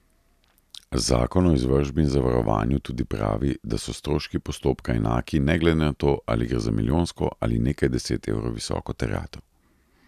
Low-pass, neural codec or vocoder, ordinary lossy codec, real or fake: 14.4 kHz; none; none; real